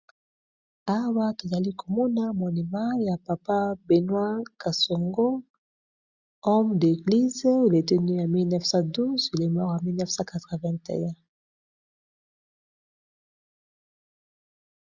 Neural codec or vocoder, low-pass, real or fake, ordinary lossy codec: none; 7.2 kHz; real; Opus, 64 kbps